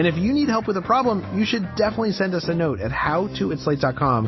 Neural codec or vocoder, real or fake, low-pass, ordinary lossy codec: none; real; 7.2 kHz; MP3, 24 kbps